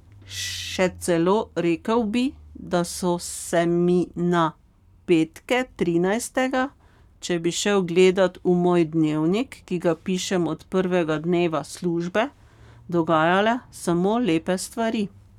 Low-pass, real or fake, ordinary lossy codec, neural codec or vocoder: 19.8 kHz; fake; none; codec, 44.1 kHz, 7.8 kbps, Pupu-Codec